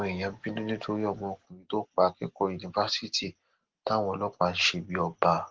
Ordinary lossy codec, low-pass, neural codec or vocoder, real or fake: Opus, 16 kbps; 7.2 kHz; none; real